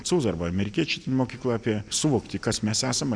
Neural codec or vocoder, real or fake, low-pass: none; real; 9.9 kHz